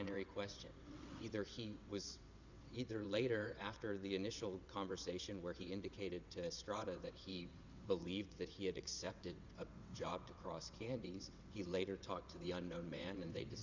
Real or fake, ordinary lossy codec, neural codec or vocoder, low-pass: fake; Opus, 64 kbps; vocoder, 22.05 kHz, 80 mel bands, WaveNeXt; 7.2 kHz